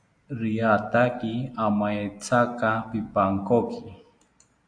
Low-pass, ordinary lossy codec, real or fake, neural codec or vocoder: 9.9 kHz; AAC, 64 kbps; real; none